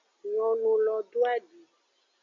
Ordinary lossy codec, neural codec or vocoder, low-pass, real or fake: AAC, 48 kbps; none; 7.2 kHz; real